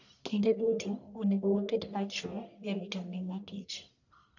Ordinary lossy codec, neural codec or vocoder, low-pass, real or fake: none; codec, 44.1 kHz, 1.7 kbps, Pupu-Codec; 7.2 kHz; fake